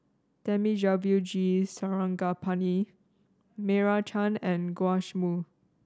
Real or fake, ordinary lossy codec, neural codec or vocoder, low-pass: real; none; none; none